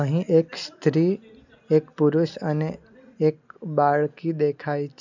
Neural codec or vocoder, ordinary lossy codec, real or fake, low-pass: none; none; real; 7.2 kHz